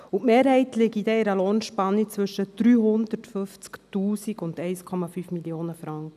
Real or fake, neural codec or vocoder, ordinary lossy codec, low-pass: real; none; none; 14.4 kHz